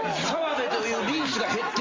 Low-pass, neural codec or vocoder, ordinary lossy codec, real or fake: 7.2 kHz; none; Opus, 32 kbps; real